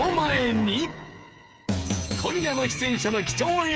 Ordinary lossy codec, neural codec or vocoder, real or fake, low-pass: none; codec, 16 kHz, 8 kbps, FreqCodec, smaller model; fake; none